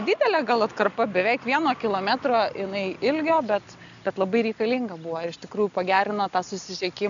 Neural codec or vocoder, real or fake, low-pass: none; real; 7.2 kHz